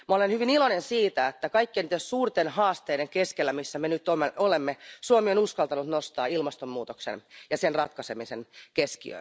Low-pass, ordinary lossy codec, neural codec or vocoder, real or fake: none; none; none; real